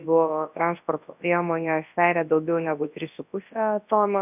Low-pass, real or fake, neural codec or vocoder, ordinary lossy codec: 3.6 kHz; fake; codec, 24 kHz, 0.9 kbps, WavTokenizer, large speech release; AAC, 32 kbps